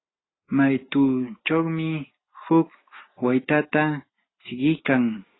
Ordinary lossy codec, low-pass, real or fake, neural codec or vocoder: AAC, 16 kbps; 7.2 kHz; real; none